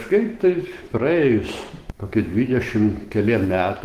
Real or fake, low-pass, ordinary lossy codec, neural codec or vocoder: fake; 14.4 kHz; Opus, 16 kbps; autoencoder, 48 kHz, 128 numbers a frame, DAC-VAE, trained on Japanese speech